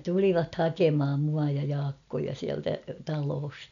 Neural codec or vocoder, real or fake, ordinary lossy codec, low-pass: none; real; none; 7.2 kHz